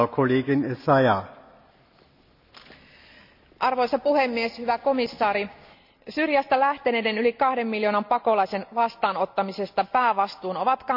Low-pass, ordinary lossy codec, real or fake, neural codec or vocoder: 5.4 kHz; none; real; none